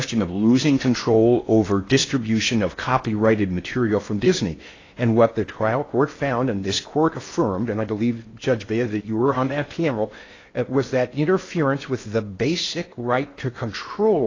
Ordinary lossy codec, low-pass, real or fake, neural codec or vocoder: AAC, 32 kbps; 7.2 kHz; fake; codec, 16 kHz in and 24 kHz out, 0.6 kbps, FocalCodec, streaming, 4096 codes